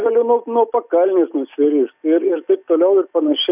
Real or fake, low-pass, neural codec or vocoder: real; 3.6 kHz; none